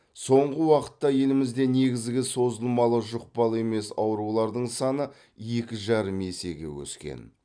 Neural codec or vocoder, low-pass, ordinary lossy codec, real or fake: none; 9.9 kHz; none; real